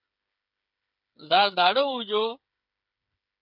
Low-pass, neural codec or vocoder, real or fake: 5.4 kHz; codec, 16 kHz, 8 kbps, FreqCodec, smaller model; fake